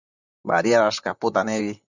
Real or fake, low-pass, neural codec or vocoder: fake; 7.2 kHz; vocoder, 44.1 kHz, 128 mel bands, Pupu-Vocoder